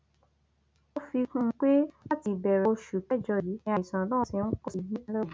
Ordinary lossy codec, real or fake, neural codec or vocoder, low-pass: none; real; none; none